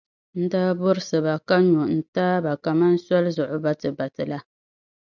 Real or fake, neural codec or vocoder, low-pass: real; none; 7.2 kHz